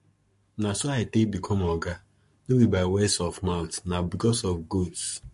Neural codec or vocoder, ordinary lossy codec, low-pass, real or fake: codec, 44.1 kHz, 7.8 kbps, Pupu-Codec; MP3, 48 kbps; 14.4 kHz; fake